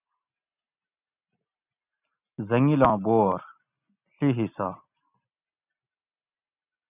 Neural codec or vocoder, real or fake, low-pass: none; real; 3.6 kHz